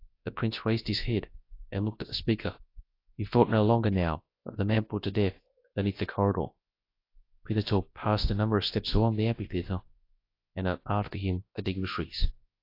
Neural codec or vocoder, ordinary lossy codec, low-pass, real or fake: codec, 24 kHz, 0.9 kbps, WavTokenizer, large speech release; AAC, 32 kbps; 5.4 kHz; fake